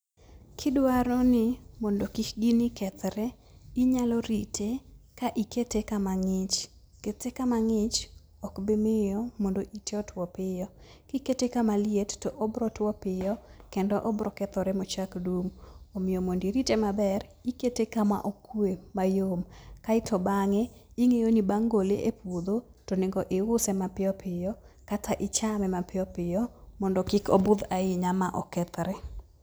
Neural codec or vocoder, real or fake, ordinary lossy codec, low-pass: none; real; none; none